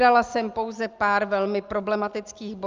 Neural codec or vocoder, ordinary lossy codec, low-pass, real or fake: none; Opus, 24 kbps; 7.2 kHz; real